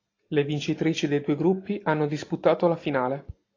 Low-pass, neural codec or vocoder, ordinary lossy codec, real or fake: 7.2 kHz; none; AAC, 32 kbps; real